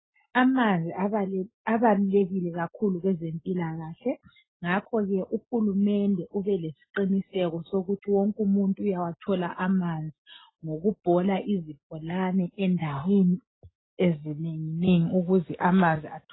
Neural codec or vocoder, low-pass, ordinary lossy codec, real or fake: none; 7.2 kHz; AAC, 16 kbps; real